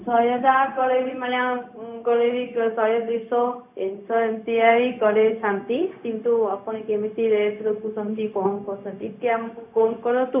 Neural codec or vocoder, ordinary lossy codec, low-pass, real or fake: codec, 16 kHz, 0.4 kbps, LongCat-Audio-Codec; none; 3.6 kHz; fake